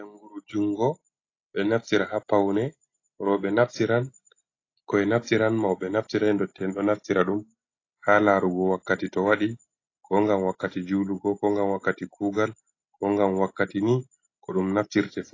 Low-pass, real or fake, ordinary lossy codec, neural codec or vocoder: 7.2 kHz; real; AAC, 32 kbps; none